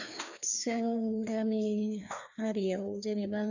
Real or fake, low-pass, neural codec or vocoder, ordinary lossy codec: fake; 7.2 kHz; codec, 16 kHz, 2 kbps, FreqCodec, larger model; none